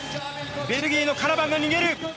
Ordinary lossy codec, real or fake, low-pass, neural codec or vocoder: none; real; none; none